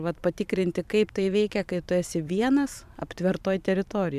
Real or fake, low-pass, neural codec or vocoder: fake; 14.4 kHz; vocoder, 44.1 kHz, 128 mel bands every 512 samples, BigVGAN v2